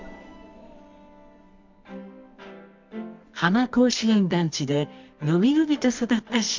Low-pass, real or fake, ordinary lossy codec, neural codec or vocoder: 7.2 kHz; fake; MP3, 64 kbps; codec, 24 kHz, 0.9 kbps, WavTokenizer, medium music audio release